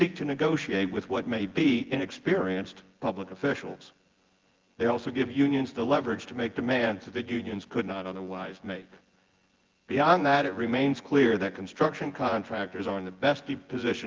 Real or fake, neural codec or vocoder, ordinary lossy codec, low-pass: fake; vocoder, 24 kHz, 100 mel bands, Vocos; Opus, 16 kbps; 7.2 kHz